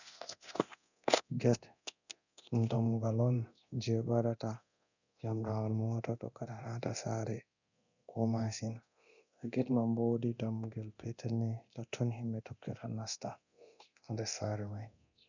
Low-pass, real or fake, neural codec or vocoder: 7.2 kHz; fake; codec, 24 kHz, 0.9 kbps, DualCodec